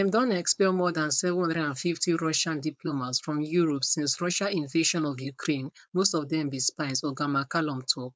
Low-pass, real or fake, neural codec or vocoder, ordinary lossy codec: none; fake; codec, 16 kHz, 4.8 kbps, FACodec; none